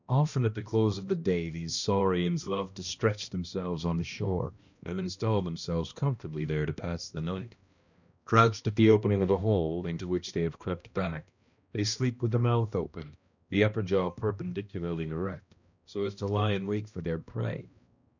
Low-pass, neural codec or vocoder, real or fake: 7.2 kHz; codec, 16 kHz, 1 kbps, X-Codec, HuBERT features, trained on balanced general audio; fake